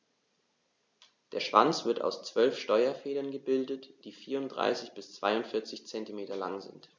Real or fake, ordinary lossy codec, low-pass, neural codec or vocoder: real; none; none; none